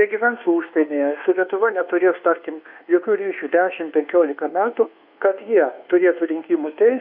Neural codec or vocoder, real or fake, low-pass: codec, 24 kHz, 1.2 kbps, DualCodec; fake; 5.4 kHz